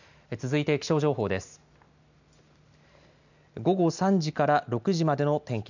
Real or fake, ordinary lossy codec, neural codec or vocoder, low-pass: real; none; none; 7.2 kHz